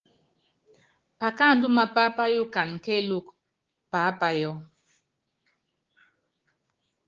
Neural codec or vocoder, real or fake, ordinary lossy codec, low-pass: codec, 16 kHz, 6 kbps, DAC; fake; Opus, 16 kbps; 7.2 kHz